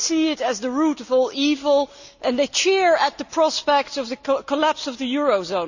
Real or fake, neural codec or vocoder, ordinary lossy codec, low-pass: real; none; none; 7.2 kHz